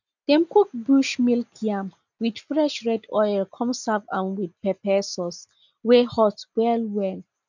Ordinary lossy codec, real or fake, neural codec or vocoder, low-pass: none; real; none; 7.2 kHz